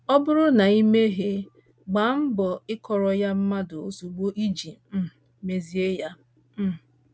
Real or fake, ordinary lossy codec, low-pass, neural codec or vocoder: real; none; none; none